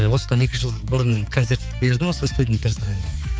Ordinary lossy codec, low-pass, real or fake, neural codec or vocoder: none; none; fake; codec, 16 kHz, 4 kbps, X-Codec, HuBERT features, trained on balanced general audio